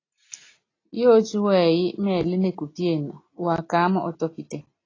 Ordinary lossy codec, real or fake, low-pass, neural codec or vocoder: AAC, 32 kbps; real; 7.2 kHz; none